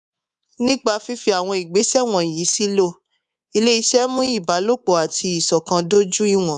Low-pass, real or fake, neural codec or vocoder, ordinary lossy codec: 10.8 kHz; fake; codec, 24 kHz, 3.1 kbps, DualCodec; none